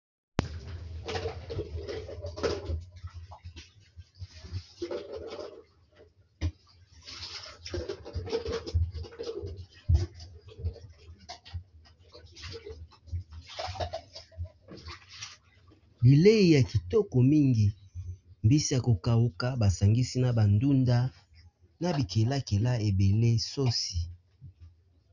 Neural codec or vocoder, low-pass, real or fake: none; 7.2 kHz; real